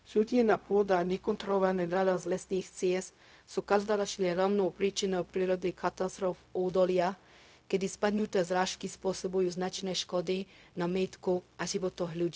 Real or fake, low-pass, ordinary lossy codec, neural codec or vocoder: fake; none; none; codec, 16 kHz, 0.4 kbps, LongCat-Audio-Codec